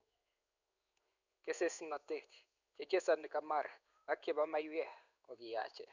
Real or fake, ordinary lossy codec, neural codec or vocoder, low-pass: fake; none; codec, 16 kHz in and 24 kHz out, 1 kbps, XY-Tokenizer; 7.2 kHz